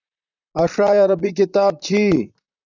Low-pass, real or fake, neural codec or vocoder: 7.2 kHz; fake; vocoder, 44.1 kHz, 128 mel bands, Pupu-Vocoder